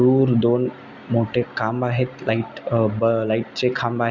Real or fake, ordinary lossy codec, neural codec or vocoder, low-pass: real; none; none; 7.2 kHz